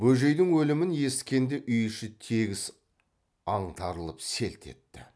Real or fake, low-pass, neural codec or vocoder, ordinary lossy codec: real; none; none; none